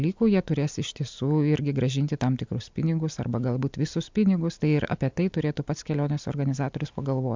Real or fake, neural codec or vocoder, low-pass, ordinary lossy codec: real; none; 7.2 kHz; MP3, 64 kbps